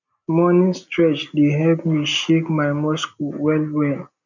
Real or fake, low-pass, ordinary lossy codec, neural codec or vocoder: real; 7.2 kHz; none; none